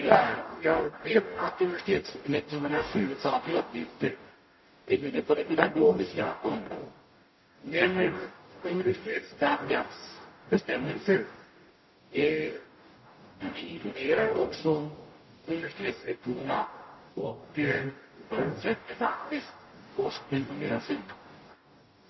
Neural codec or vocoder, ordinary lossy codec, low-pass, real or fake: codec, 44.1 kHz, 0.9 kbps, DAC; MP3, 24 kbps; 7.2 kHz; fake